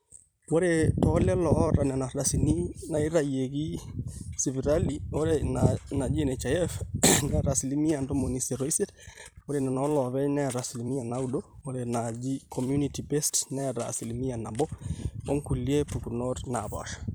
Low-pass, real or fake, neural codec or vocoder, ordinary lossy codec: none; fake; vocoder, 44.1 kHz, 128 mel bands every 256 samples, BigVGAN v2; none